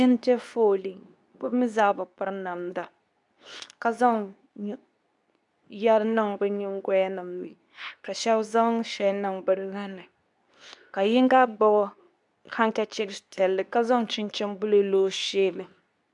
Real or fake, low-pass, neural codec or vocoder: fake; 10.8 kHz; codec, 24 kHz, 0.9 kbps, WavTokenizer, medium speech release version 2